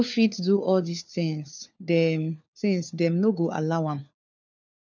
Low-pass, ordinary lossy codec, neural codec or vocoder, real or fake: 7.2 kHz; none; codec, 16 kHz, 4 kbps, FunCodec, trained on LibriTTS, 50 frames a second; fake